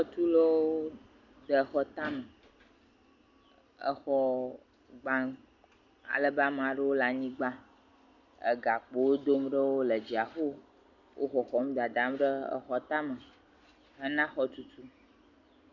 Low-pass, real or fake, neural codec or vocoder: 7.2 kHz; real; none